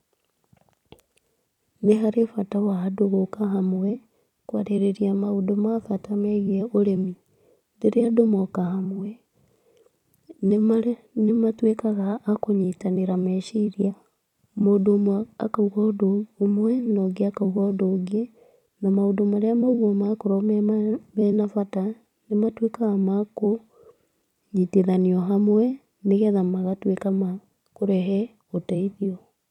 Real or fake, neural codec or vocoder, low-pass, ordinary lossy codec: fake; vocoder, 44.1 kHz, 128 mel bands every 256 samples, BigVGAN v2; 19.8 kHz; none